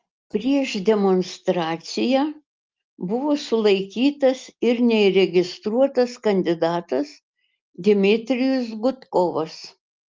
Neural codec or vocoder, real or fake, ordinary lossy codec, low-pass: none; real; Opus, 24 kbps; 7.2 kHz